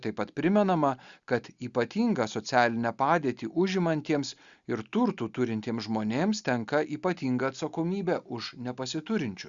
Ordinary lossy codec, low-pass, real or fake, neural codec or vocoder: Opus, 64 kbps; 7.2 kHz; real; none